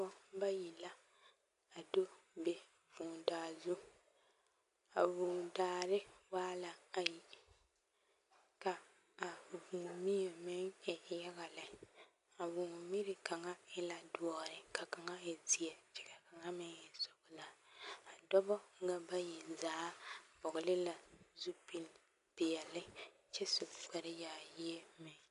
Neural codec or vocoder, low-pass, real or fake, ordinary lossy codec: none; 10.8 kHz; real; MP3, 64 kbps